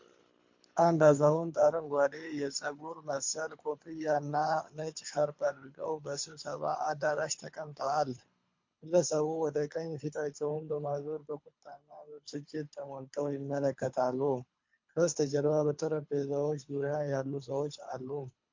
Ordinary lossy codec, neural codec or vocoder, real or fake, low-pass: MP3, 48 kbps; codec, 24 kHz, 3 kbps, HILCodec; fake; 7.2 kHz